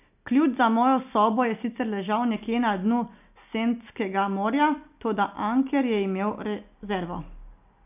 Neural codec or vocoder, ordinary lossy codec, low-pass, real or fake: none; none; 3.6 kHz; real